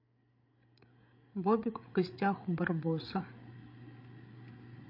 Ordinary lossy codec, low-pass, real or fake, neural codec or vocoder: MP3, 32 kbps; 5.4 kHz; fake; codec, 16 kHz, 8 kbps, FreqCodec, larger model